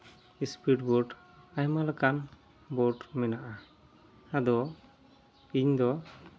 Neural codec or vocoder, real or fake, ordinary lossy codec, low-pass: none; real; none; none